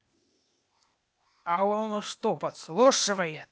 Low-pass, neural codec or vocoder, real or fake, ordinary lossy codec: none; codec, 16 kHz, 0.8 kbps, ZipCodec; fake; none